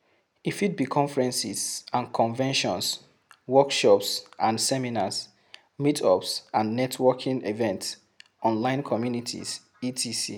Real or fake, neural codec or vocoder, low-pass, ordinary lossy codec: real; none; none; none